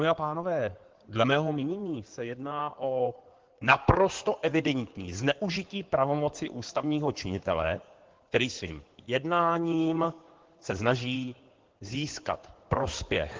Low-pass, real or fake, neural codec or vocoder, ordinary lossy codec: 7.2 kHz; fake; codec, 16 kHz in and 24 kHz out, 2.2 kbps, FireRedTTS-2 codec; Opus, 16 kbps